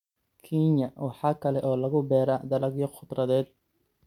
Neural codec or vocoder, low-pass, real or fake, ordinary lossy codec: none; 19.8 kHz; real; none